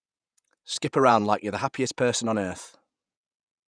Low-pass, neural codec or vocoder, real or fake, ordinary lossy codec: 9.9 kHz; vocoder, 48 kHz, 128 mel bands, Vocos; fake; none